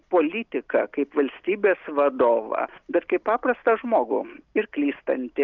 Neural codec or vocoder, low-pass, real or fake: none; 7.2 kHz; real